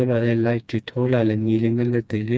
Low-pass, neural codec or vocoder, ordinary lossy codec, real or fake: none; codec, 16 kHz, 2 kbps, FreqCodec, smaller model; none; fake